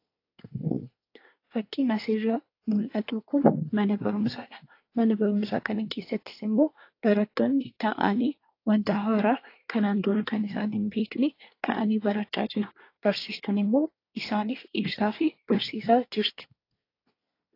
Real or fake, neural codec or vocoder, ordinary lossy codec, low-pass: fake; codec, 24 kHz, 1 kbps, SNAC; AAC, 32 kbps; 5.4 kHz